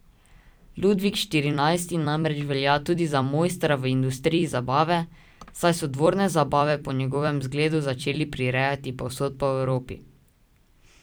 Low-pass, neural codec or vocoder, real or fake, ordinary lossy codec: none; vocoder, 44.1 kHz, 128 mel bands every 256 samples, BigVGAN v2; fake; none